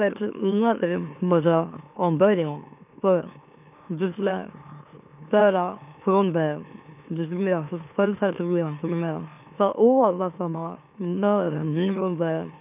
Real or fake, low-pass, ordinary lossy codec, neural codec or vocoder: fake; 3.6 kHz; none; autoencoder, 44.1 kHz, a latent of 192 numbers a frame, MeloTTS